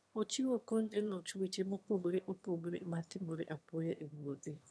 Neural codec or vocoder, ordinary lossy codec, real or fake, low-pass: autoencoder, 22.05 kHz, a latent of 192 numbers a frame, VITS, trained on one speaker; none; fake; none